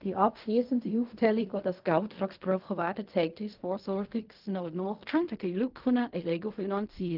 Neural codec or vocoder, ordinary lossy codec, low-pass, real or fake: codec, 16 kHz in and 24 kHz out, 0.4 kbps, LongCat-Audio-Codec, fine tuned four codebook decoder; Opus, 32 kbps; 5.4 kHz; fake